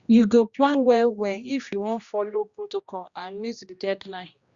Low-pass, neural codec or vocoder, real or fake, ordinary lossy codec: 7.2 kHz; codec, 16 kHz, 1 kbps, X-Codec, HuBERT features, trained on general audio; fake; Opus, 64 kbps